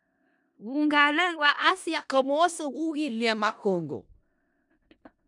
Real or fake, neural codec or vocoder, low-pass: fake; codec, 16 kHz in and 24 kHz out, 0.4 kbps, LongCat-Audio-Codec, four codebook decoder; 10.8 kHz